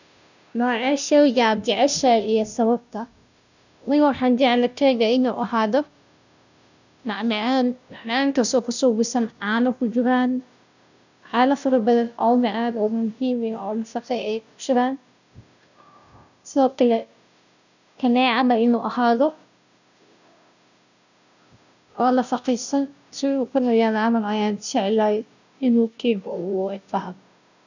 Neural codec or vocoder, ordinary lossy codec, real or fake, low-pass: codec, 16 kHz, 0.5 kbps, FunCodec, trained on Chinese and English, 25 frames a second; none; fake; 7.2 kHz